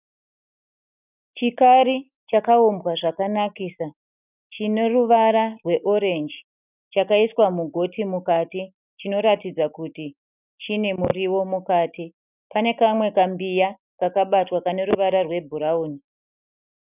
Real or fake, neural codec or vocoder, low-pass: real; none; 3.6 kHz